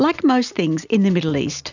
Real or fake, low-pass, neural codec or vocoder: real; 7.2 kHz; none